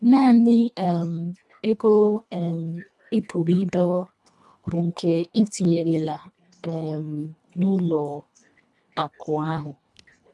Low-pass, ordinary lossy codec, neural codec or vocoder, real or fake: none; none; codec, 24 kHz, 1.5 kbps, HILCodec; fake